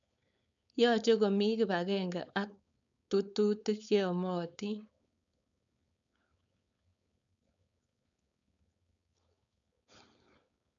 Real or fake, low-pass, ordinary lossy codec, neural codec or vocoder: fake; 7.2 kHz; none; codec, 16 kHz, 4.8 kbps, FACodec